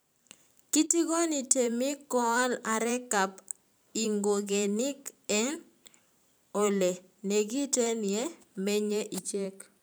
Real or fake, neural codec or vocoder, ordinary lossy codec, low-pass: fake; vocoder, 44.1 kHz, 128 mel bands every 512 samples, BigVGAN v2; none; none